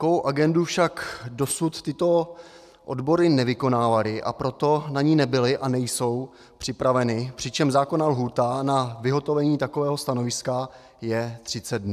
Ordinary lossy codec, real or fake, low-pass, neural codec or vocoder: AAC, 96 kbps; real; 14.4 kHz; none